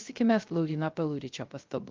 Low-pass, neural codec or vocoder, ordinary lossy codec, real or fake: 7.2 kHz; codec, 16 kHz, 0.3 kbps, FocalCodec; Opus, 32 kbps; fake